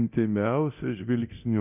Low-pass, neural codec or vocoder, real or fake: 3.6 kHz; codec, 24 kHz, 0.9 kbps, DualCodec; fake